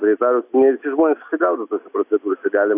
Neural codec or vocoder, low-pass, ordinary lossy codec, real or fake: none; 3.6 kHz; AAC, 32 kbps; real